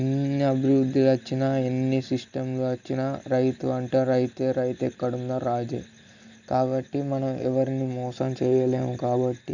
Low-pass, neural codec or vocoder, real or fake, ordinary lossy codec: 7.2 kHz; none; real; none